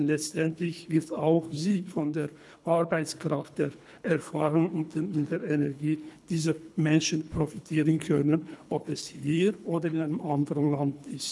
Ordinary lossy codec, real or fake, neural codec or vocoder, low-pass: none; fake; codec, 24 kHz, 3 kbps, HILCodec; none